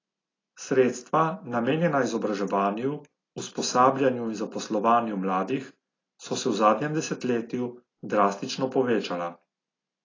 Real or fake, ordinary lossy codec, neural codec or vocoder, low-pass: real; AAC, 32 kbps; none; 7.2 kHz